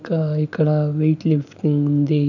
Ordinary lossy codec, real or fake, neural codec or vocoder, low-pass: none; real; none; 7.2 kHz